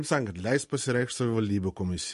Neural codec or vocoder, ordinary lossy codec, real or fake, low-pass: none; MP3, 48 kbps; real; 14.4 kHz